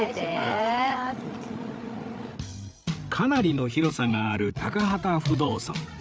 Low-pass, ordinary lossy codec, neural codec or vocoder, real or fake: none; none; codec, 16 kHz, 8 kbps, FreqCodec, larger model; fake